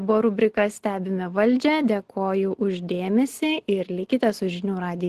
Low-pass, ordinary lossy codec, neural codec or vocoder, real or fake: 14.4 kHz; Opus, 16 kbps; none; real